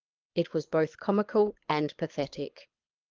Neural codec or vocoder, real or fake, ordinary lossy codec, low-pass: codec, 24 kHz, 6 kbps, HILCodec; fake; Opus, 32 kbps; 7.2 kHz